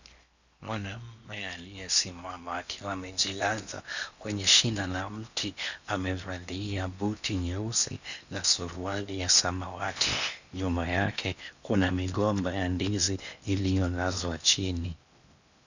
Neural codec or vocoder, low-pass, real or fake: codec, 16 kHz in and 24 kHz out, 0.8 kbps, FocalCodec, streaming, 65536 codes; 7.2 kHz; fake